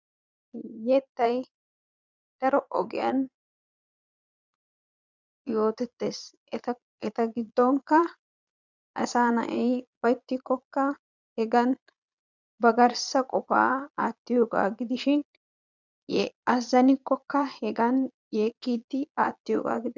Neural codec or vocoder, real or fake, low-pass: vocoder, 22.05 kHz, 80 mel bands, Vocos; fake; 7.2 kHz